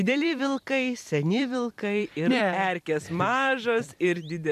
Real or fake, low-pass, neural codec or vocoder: fake; 14.4 kHz; vocoder, 44.1 kHz, 128 mel bands every 256 samples, BigVGAN v2